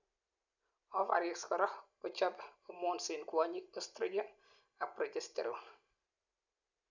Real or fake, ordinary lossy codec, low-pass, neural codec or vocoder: real; none; 7.2 kHz; none